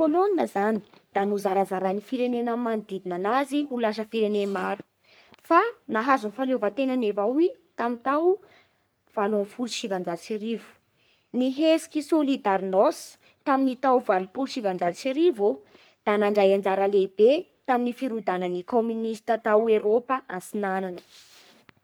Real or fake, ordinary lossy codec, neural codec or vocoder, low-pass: fake; none; codec, 44.1 kHz, 3.4 kbps, Pupu-Codec; none